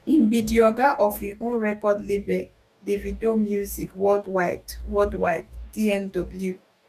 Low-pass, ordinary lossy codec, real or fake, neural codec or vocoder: 14.4 kHz; none; fake; codec, 44.1 kHz, 2.6 kbps, DAC